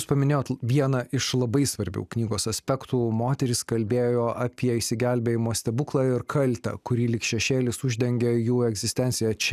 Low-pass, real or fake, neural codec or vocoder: 14.4 kHz; real; none